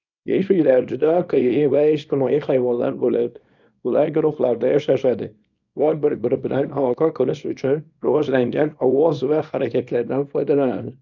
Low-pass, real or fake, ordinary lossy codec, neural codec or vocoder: 7.2 kHz; fake; none; codec, 24 kHz, 0.9 kbps, WavTokenizer, small release